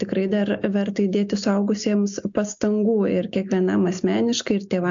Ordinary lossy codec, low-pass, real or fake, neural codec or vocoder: AAC, 64 kbps; 7.2 kHz; real; none